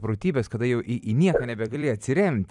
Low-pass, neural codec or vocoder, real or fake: 10.8 kHz; vocoder, 44.1 kHz, 128 mel bands every 256 samples, BigVGAN v2; fake